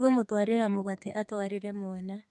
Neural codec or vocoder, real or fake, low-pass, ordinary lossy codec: codec, 32 kHz, 1.9 kbps, SNAC; fake; 10.8 kHz; MP3, 64 kbps